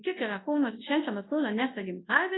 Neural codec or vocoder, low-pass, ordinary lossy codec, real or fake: codec, 24 kHz, 0.9 kbps, WavTokenizer, large speech release; 7.2 kHz; AAC, 16 kbps; fake